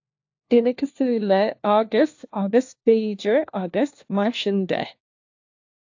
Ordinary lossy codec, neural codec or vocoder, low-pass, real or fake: AAC, 48 kbps; codec, 16 kHz, 1 kbps, FunCodec, trained on LibriTTS, 50 frames a second; 7.2 kHz; fake